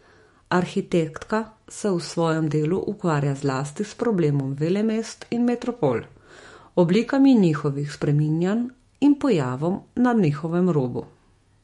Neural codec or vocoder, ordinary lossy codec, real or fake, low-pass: autoencoder, 48 kHz, 128 numbers a frame, DAC-VAE, trained on Japanese speech; MP3, 48 kbps; fake; 19.8 kHz